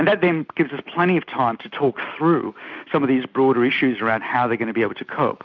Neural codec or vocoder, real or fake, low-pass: none; real; 7.2 kHz